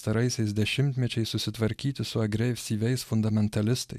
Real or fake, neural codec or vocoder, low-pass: real; none; 14.4 kHz